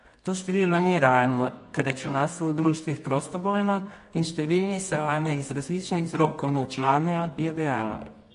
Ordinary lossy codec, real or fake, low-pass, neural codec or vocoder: MP3, 48 kbps; fake; 10.8 kHz; codec, 24 kHz, 0.9 kbps, WavTokenizer, medium music audio release